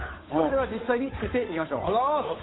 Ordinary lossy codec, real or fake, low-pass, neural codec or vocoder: AAC, 16 kbps; fake; 7.2 kHz; codec, 16 kHz, 8 kbps, FunCodec, trained on Chinese and English, 25 frames a second